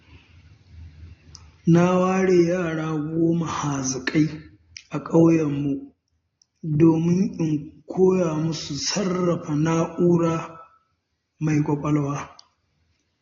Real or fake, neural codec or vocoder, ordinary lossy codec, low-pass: real; none; AAC, 32 kbps; 7.2 kHz